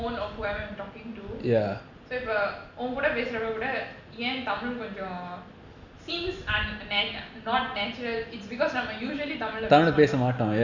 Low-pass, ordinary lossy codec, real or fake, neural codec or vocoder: 7.2 kHz; none; real; none